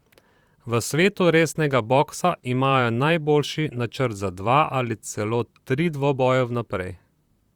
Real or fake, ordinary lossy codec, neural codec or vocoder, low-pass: fake; Opus, 64 kbps; vocoder, 44.1 kHz, 128 mel bands, Pupu-Vocoder; 19.8 kHz